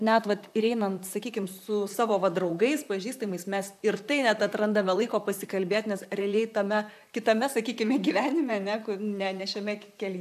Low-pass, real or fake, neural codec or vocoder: 14.4 kHz; fake; vocoder, 44.1 kHz, 128 mel bands, Pupu-Vocoder